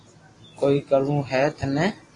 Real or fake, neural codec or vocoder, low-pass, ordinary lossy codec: real; none; 10.8 kHz; AAC, 32 kbps